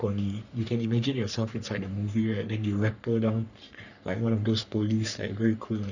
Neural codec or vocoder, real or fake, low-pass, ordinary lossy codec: codec, 44.1 kHz, 3.4 kbps, Pupu-Codec; fake; 7.2 kHz; none